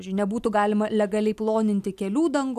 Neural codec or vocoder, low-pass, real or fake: none; 14.4 kHz; real